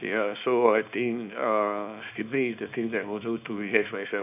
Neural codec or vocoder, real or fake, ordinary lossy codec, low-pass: codec, 24 kHz, 0.9 kbps, WavTokenizer, small release; fake; none; 3.6 kHz